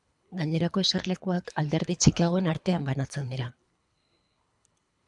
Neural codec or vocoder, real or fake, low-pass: codec, 24 kHz, 3 kbps, HILCodec; fake; 10.8 kHz